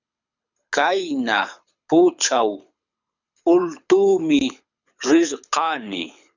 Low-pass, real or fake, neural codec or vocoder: 7.2 kHz; fake; codec, 24 kHz, 6 kbps, HILCodec